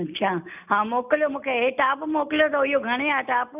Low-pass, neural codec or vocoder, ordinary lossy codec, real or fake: 3.6 kHz; none; none; real